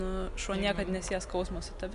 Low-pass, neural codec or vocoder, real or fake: 10.8 kHz; none; real